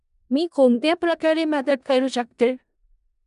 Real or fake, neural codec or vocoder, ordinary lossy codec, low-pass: fake; codec, 16 kHz in and 24 kHz out, 0.4 kbps, LongCat-Audio-Codec, four codebook decoder; none; 10.8 kHz